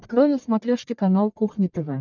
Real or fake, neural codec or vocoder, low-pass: fake; codec, 44.1 kHz, 1.7 kbps, Pupu-Codec; 7.2 kHz